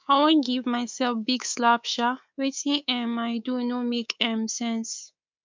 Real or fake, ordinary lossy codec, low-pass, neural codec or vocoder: fake; MP3, 64 kbps; 7.2 kHz; codec, 24 kHz, 3.1 kbps, DualCodec